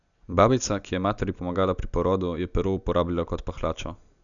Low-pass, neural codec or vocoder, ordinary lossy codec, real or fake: 7.2 kHz; none; none; real